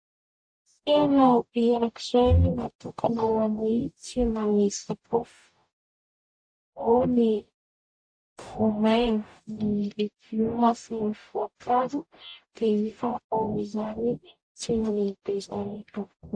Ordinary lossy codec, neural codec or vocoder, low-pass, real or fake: AAC, 64 kbps; codec, 44.1 kHz, 0.9 kbps, DAC; 9.9 kHz; fake